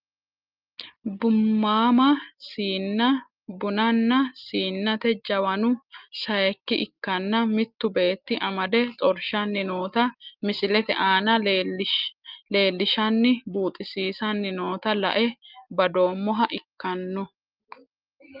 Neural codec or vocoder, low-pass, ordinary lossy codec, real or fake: none; 5.4 kHz; Opus, 24 kbps; real